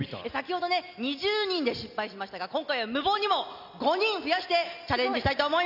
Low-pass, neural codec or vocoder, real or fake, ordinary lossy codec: 5.4 kHz; none; real; none